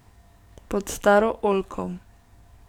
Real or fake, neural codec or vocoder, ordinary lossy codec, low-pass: fake; codec, 44.1 kHz, 7.8 kbps, DAC; none; 19.8 kHz